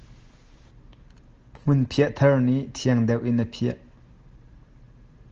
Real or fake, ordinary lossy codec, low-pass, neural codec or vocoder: real; Opus, 16 kbps; 7.2 kHz; none